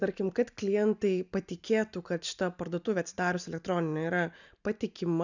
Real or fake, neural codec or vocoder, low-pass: real; none; 7.2 kHz